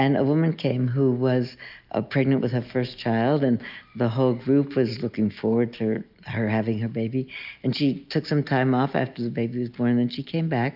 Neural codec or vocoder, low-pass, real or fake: none; 5.4 kHz; real